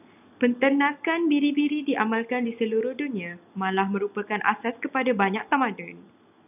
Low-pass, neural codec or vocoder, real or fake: 3.6 kHz; none; real